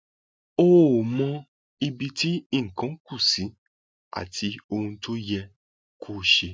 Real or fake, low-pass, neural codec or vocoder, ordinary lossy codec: real; none; none; none